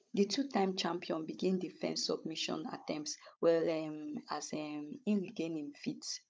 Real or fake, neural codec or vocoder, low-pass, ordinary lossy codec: fake; codec, 16 kHz, 16 kbps, FunCodec, trained on Chinese and English, 50 frames a second; none; none